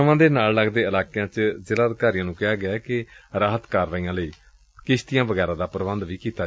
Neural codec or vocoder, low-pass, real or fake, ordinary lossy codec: none; none; real; none